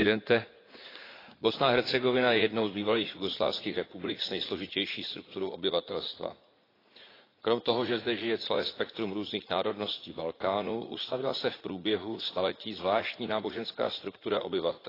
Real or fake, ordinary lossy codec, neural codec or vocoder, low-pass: fake; AAC, 24 kbps; vocoder, 44.1 kHz, 80 mel bands, Vocos; 5.4 kHz